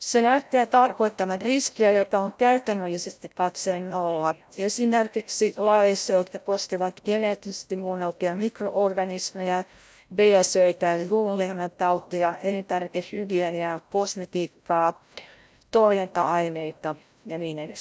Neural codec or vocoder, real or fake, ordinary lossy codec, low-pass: codec, 16 kHz, 0.5 kbps, FreqCodec, larger model; fake; none; none